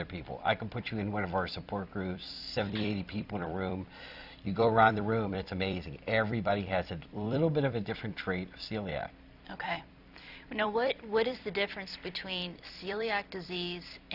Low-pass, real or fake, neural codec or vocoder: 5.4 kHz; fake; vocoder, 44.1 kHz, 128 mel bands every 256 samples, BigVGAN v2